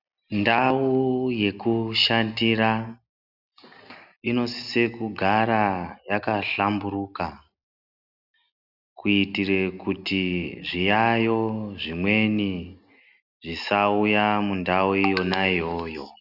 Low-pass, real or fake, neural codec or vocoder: 5.4 kHz; real; none